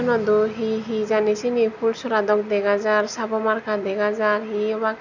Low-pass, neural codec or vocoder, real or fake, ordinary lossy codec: 7.2 kHz; none; real; none